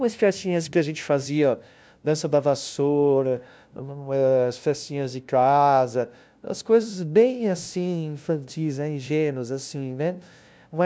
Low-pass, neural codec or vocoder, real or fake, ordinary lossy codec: none; codec, 16 kHz, 0.5 kbps, FunCodec, trained on LibriTTS, 25 frames a second; fake; none